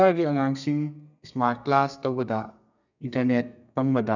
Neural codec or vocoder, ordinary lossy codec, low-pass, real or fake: codec, 32 kHz, 1.9 kbps, SNAC; none; 7.2 kHz; fake